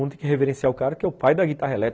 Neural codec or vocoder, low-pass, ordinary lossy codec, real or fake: none; none; none; real